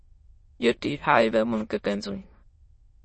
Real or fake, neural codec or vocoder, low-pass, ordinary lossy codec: fake; autoencoder, 22.05 kHz, a latent of 192 numbers a frame, VITS, trained on many speakers; 9.9 kHz; MP3, 32 kbps